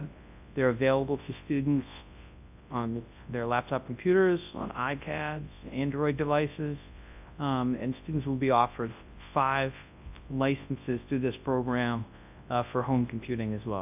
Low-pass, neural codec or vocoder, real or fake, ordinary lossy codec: 3.6 kHz; codec, 24 kHz, 0.9 kbps, WavTokenizer, large speech release; fake; AAC, 32 kbps